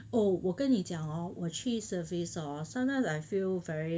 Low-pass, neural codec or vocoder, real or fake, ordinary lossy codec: none; none; real; none